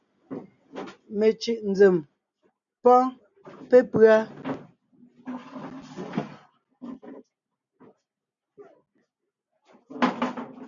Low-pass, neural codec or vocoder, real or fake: 7.2 kHz; none; real